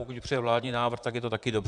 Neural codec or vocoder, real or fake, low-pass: vocoder, 44.1 kHz, 128 mel bands, Pupu-Vocoder; fake; 10.8 kHz